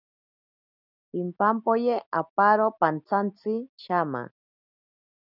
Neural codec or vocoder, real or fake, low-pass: none; real; 5.4 kHz